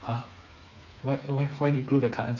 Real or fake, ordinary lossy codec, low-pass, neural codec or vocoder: fake; none; 7.2 kHz; codec, 16 kHz, 4 kbps, FreqCodec, smaller model